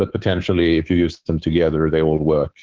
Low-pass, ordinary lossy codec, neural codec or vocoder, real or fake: 7.2 kHz; Opus, 16 kbps; codec, 24 kHz, 3.1 kbps, DualCodec; fake